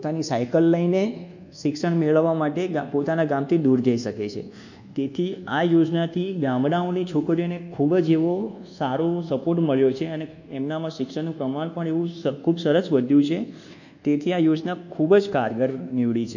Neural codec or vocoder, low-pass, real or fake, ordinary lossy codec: codec, 24 kHz, 1.2 kbps, DualCodec; 7.2 kHz; fake; none